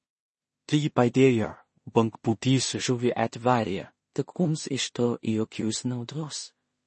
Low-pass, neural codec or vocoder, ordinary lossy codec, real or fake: 10.8 kHz; codec, 16 kHz in and 24 kHz out, 0.4 kbps, LongCat-Audio-Codec, two codebook decoder; MP3, 32 kbps; fake